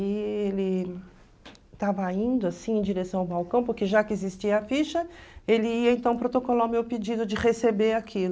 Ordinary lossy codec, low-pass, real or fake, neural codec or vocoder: none; none; real; none